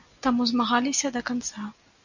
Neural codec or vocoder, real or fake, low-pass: none; real; 7.2 kHz